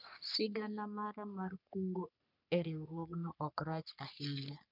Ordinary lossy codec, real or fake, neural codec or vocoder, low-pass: none; fake; codec, 44.1 kHz, 3.4 kbps, Pupu-Codec; 5.4 kHz